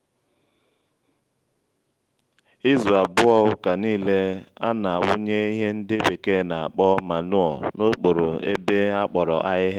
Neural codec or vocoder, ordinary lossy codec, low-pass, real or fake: autoencoder, 48 kHz, 128 numbers a frame, DAC-VAE, trained on Japanese speech; Opus, 24 kbps; 19.8 kHz; fake